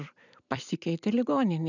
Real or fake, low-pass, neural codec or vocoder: fake; 7.2 kHz; vocoder, 44.1 kHz, 128 mel bands every 256 samples, BigVGAN v2